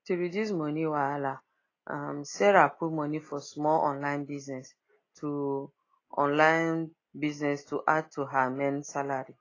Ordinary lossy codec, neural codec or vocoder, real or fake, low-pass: AAC, 32 kbps; none; real; 7.2 kHz